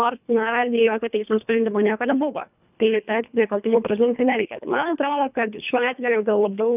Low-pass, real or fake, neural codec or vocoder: 3.6 kHz; fake; codec, 24 kHz, 1.5 kbps, HILCodec